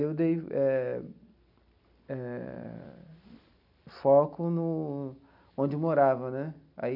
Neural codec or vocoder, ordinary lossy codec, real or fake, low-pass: none; none; real; 5.4 kHz